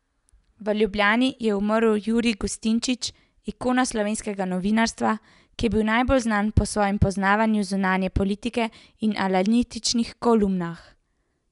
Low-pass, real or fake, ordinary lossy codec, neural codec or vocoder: 10.8 kHz; real; none; none